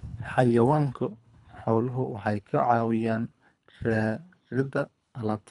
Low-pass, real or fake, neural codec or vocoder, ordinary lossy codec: 10.8 kHz; fake; codec, 24 kHz, 3 kbps, HILCodec; none